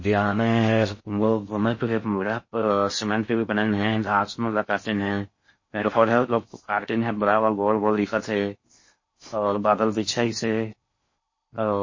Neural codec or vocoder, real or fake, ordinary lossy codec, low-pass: codec, 16 kHz in and 24 kHz out, 0.6 kbps, FocalCodec, streaming, 4096 codes; fake; MP3, 32 kbps; 7.2 kHz